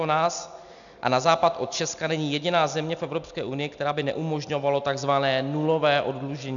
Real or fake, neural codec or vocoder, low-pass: real; none; 7.2 kHz